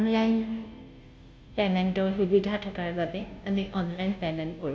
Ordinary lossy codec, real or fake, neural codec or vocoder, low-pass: none; fake; codec, 16 kHz, 0.5 kbps, FunCodec, trained on Chinese and English, 25 frames a second; none